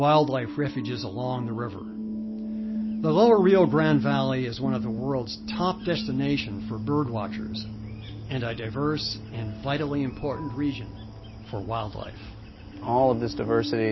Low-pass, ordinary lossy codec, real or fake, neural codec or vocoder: 7.2 kHz; MP3, 24 kbps; real; none